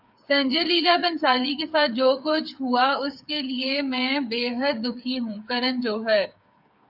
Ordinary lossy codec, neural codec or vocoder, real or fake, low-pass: AAC, 48 kbps; codec, 16 kHz, 8 kbps, FreqCodec, smaller model; fake; 5.4 kHz